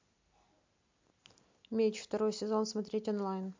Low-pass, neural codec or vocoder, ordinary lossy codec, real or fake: 7.2 kHz; none; MP3, 64 kbps; real